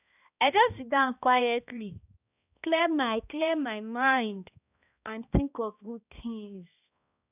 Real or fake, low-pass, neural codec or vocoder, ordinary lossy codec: fake; 3.6 kHz; codec, 16 kHz, 1 kbps, X-Codec, HuBERT features, trained on balanced general audio; none